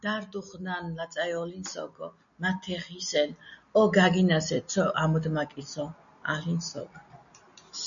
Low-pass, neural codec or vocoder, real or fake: 7.2 kHz; none; real